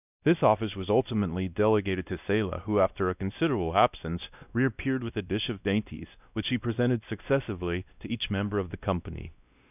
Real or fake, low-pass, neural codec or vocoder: fake; 3.6 kHz; codec, 16 kHz, 1 kbps, X-Codec, WavLM features, trained on Multilingual LibriSpeech